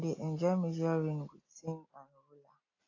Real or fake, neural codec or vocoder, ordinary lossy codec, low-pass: real; none; AAC, 32 kbps; 7.2 kHz